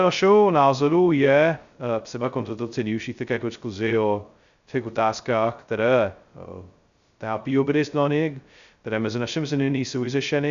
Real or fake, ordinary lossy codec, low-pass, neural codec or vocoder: fake; Opus, 64 kbps; 7.2 kHz; codec, 16 kHz, 0.2 kbps, FocalCodec